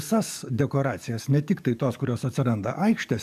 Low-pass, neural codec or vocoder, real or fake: 14.4 kHz; codec, 44.1 kHz, 7.8 kbps, DAC; fake